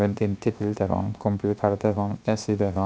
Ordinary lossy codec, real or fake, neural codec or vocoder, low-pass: none; fake; codec, 16 kHz, 0.7 kbps, FocalCodec; none